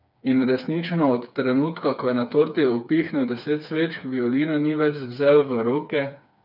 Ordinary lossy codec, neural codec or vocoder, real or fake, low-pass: none; codec, 16 kHz, 4 kbps, FreqCodec, smaller model; fake; 5.4 kHz